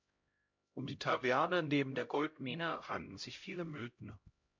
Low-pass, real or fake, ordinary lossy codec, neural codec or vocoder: 7.2 kHz; fake; MP3, 48 kbps; codec, 16 kHz, 0.5 kbps, X-Codec, HuBERT features, trained on LibriSpeech